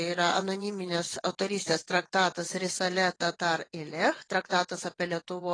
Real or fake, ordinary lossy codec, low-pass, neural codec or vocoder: fake; AAC, 32 kbps; 9.9 kHz; vocoder, 22.05 kHz, 80 mel bands, WaveNeXt